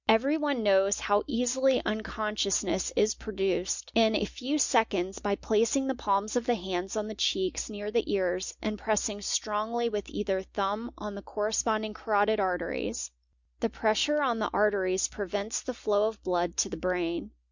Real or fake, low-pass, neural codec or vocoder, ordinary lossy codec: real; 7.2 kHz; none; Opus, 64 kbps